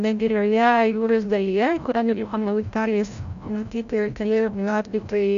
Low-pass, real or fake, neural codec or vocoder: 7.2 kHz; fake; codec, 16 kHz, 0.5 kbps, FreqCodec, larger model